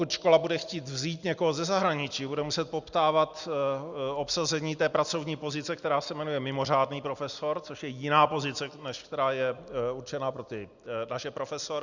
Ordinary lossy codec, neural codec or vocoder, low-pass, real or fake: Opus, 64 kbps; none; 7.2 kHz; real